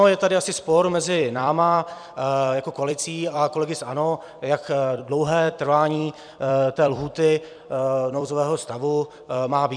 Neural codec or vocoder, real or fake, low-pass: vocoder, 44.1 kHz, 128 mel bands every 256 samples, BigVGAN v2; fake; 9.9 kHz